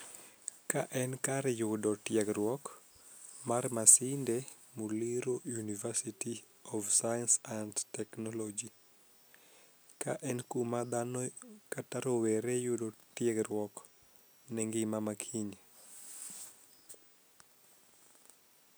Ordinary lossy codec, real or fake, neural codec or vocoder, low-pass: none; real; none; none